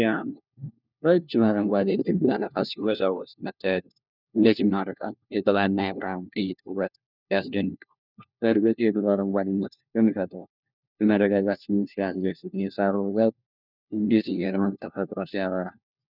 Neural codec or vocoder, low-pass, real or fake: codec, 16 kHz, 1 kbps, FunCodec, trained on LibriTTS, 50 frames a second; 5.4 kHz; fake